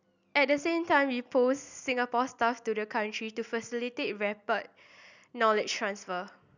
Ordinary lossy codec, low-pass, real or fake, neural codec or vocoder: none; 7.2 kHz; real; none